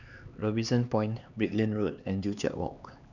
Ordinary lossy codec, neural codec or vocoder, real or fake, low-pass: none; codec, 16 kHz, 2 kbps, X-Codec, HuBERT features, trained on LibriSpeech; fake; 7.2 kHz